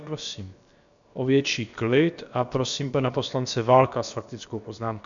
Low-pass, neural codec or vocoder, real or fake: 7.2 kHz; codec, 16 kHz, about 1 kbps, DyCAST, with the encoder's durations; fake